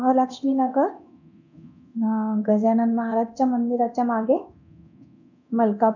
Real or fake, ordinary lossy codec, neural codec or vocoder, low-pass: fake; none; codec, 24 kHz, 0.9 kbps, DualCodec; 7.2 kHz